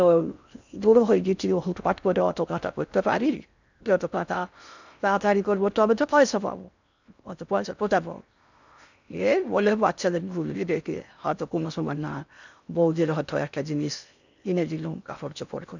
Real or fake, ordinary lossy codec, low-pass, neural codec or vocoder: fake; none; 7.2 kHz; codec, 16 kHz in and 24 kHz out, 0.6 kbps, FocalCodec, streaming, 4096 codes